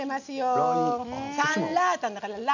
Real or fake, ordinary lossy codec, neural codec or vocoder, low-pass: real; none; none; 7.2 kHz